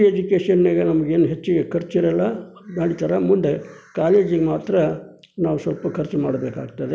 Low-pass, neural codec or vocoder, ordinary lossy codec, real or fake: none; none; none; real